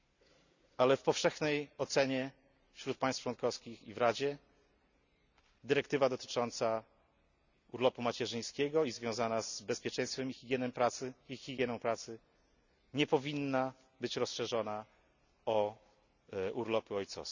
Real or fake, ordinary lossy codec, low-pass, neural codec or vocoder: real; none; 7.2 kHz; none